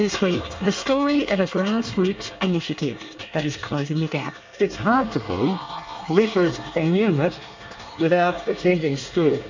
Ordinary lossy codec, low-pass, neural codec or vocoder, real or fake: MP3, 64 kbps; 7.2 kHz; codec, 24 kHz, 1 kbps, SNAC; fake